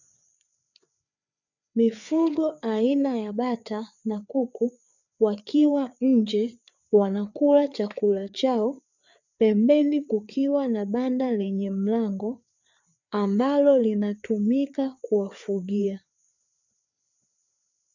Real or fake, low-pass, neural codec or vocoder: fake; 7.2 kHz; codec, 16 kHz, 4 kbps, FreqCodec, larger model